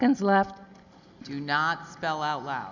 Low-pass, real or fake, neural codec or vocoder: 7.2 kHz; real; none